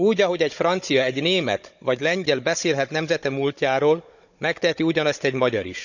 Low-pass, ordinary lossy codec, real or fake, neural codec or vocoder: 7.2 kHz; none; fake; codec, 16 kHz, 16 kbps, FunCodec, trained on Chinese and English, 50 frames a second